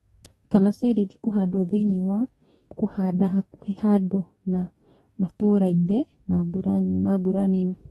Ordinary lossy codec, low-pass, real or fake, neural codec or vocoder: AAC, 32 kbps; 19.8 kHz; fake; codec, 44.1 kHz, 2.6 kbps, DAC